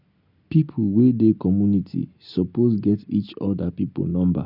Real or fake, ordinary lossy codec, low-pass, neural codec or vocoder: real; none; 5.4 kHz; none